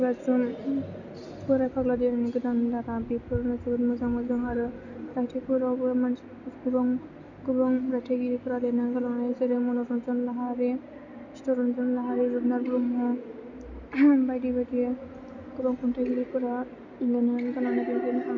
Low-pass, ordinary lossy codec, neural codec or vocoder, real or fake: 7.2 kHz; none; none; real